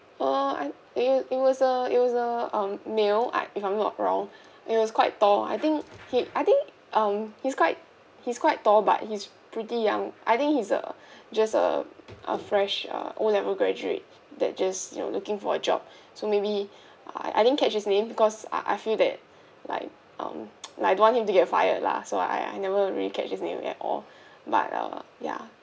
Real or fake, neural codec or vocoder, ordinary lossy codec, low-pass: real; none; none; none